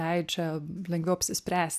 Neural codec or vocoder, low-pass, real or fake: none; 14.4 kHz; real